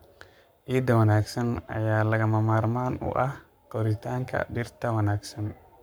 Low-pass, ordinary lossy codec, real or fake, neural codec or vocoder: none; none; fake; codec, 44.1 kHz, 7.8 kbps, Pupu-Codec